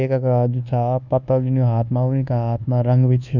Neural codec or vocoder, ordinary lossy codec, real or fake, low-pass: codec, 24 kHz, 1.2 kbps, DualCodec; none; fake; 7.2 kHz